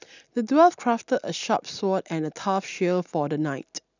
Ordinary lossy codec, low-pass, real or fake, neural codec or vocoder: none; 7.2 kHz; real; none